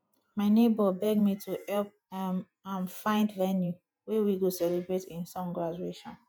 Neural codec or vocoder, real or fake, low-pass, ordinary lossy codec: vocoder, 48 kHz, 128 mel bands, Vocos; fake; none; none